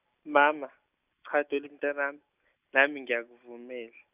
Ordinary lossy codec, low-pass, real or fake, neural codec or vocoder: none; 3.6 kHz; real; none